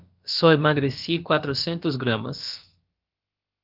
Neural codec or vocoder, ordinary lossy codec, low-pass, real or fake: codec, 16 kHz, about 1 kbps, DyCAST, with the encoder's durations; Opus, 24 kbps; 5.4 kHz; fake